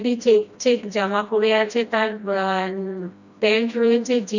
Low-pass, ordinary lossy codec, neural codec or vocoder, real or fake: 7.2 kHz; none; codec, 16 kHz, 1 kbps, FreqCodec, smaller model; fake